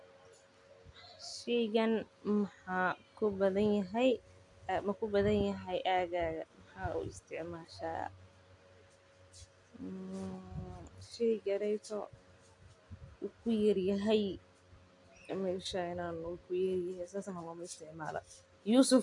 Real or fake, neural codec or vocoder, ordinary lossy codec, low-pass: real; none; AAC, 64 kbps; 10.8 kHz